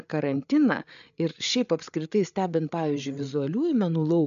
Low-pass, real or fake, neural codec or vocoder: 7.2 kHz; fake; codec, 16 kHz, 8 kbps, FreqCodec, larger model